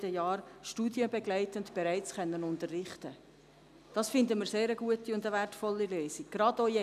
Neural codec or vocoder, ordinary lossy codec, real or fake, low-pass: none; none; real; 14.4 kHz